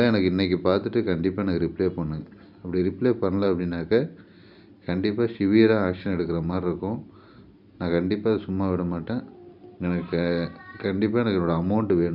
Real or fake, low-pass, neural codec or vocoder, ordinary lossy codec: real; 5.4 kHz; none; none